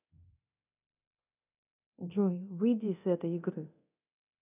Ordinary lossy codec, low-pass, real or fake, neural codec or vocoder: none; 3.6 kHz; fake; codec, 24 kHz, 0.9 kbps, DualCodec